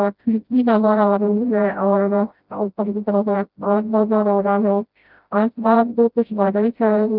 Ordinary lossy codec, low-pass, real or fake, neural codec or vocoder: Opus, 32 kbps; 5.4 kHz; fake; codec, 16 kHz, 0.5 kbps, FreqCodec, smaller model